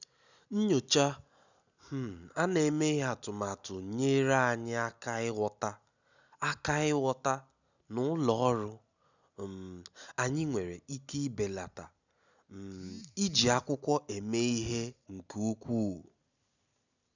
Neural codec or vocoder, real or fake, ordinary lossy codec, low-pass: none; real; none; 7.2 kHz